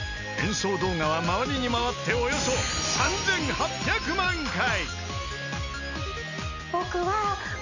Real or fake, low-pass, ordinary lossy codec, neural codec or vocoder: real; 7.2 kHz; none; none